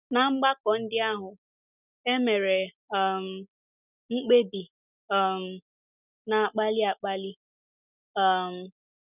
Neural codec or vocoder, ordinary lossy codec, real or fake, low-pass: none; none; real; 3.6 kHz